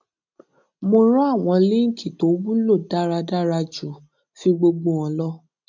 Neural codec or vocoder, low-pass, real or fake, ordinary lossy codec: none; 7.2 kHz; real; none